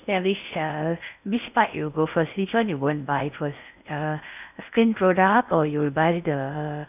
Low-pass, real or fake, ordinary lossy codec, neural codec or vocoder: 3.6 kHz; fake; none; codec, 16 kHz in and 24 kHz out, 0.6 kbps, FocalCodec, streaming, 4096 codes